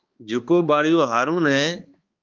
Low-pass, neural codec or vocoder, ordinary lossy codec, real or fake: 7.2 kHz; codec, 16 kHz, 2 kbps, X-Codec, HuBERT features, trained on balanced general audio; Opus, 32 kbps; fake